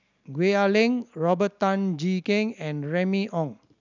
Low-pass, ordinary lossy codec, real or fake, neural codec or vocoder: 7.2 kHz; none; real; none